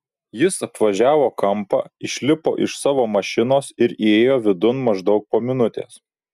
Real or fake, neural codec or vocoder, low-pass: real; none; 14.4 kHz